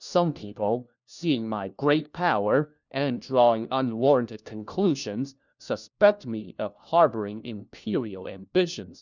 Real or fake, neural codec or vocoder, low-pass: fake; codec, 16 kHz, 1 kbps, FunCodec, trained on LibriTTS, 50 frames a second; 7.2 kHz